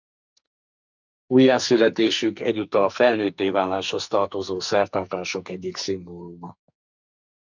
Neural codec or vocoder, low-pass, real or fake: codec, 44.1 kHz, 2.6 kbps, SNAC; 7.2 kHz; fake